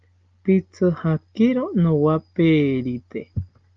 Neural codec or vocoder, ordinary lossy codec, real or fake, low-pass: none; Opus, 32 kbps; real; 7.2 kHz